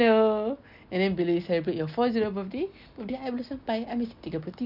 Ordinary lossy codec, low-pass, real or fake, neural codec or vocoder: none; 5.4 kHz; real; none